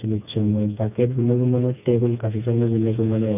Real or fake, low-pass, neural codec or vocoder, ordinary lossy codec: fake; 3.6 kHz; codec, 16 kHz, 2 kbps, FreqCodec, smaller model; none